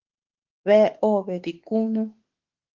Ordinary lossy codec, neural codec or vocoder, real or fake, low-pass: Opus, 16 kbps; autoencoder, 48 kHz, 32 numbers a frame, DAC-VAE, trained on Japanese speech; fake; 7.2 kHz